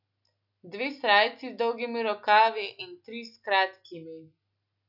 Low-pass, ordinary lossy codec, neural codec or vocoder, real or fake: 5.4 kHz; none; none; real